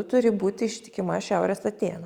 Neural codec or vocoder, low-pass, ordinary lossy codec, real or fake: none; 19.8 kHz; Opus, 64 kbps; real